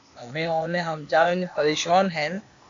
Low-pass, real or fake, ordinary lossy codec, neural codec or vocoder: 7.2 kHz; fake; AAC, 48 kbps; codec, 16 kHz, 0.8 kbps, ZipCodec